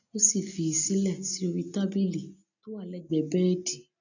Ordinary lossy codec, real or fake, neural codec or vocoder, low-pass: none; real; none; 7.2 kHz